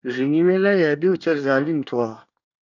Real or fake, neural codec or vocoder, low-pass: fake; codec, 24 kHz, 1 kbps, SNAC; 7.2 kHz